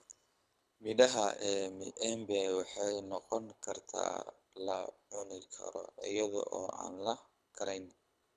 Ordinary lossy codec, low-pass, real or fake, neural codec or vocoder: none; none; fake; codec, 24 kHz, 6 kbps, HILCodec